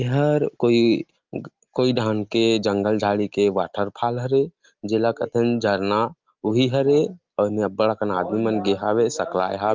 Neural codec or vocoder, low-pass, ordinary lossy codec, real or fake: none; 7.2 kHz; Opus, 32 kbps; real